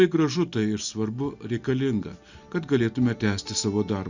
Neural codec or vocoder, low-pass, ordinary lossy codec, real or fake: none; 7.2 kHz; Opus, 64 kbps; real